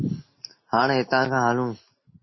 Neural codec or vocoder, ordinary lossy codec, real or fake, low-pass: none; MP3, 24 kbps; real; 7.2 kHz